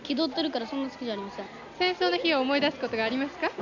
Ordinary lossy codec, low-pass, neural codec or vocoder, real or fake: none; 7.2 kHz; none; real